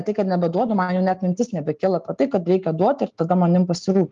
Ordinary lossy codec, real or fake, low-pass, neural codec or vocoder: Opus, 32 kbps; real; 7.2 kHz; none